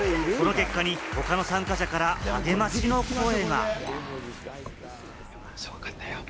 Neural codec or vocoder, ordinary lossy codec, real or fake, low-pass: none; none; real; none